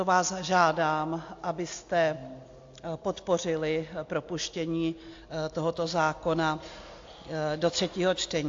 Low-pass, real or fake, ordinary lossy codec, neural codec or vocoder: 7.2 kHz; real; AAC, 48 kbps; none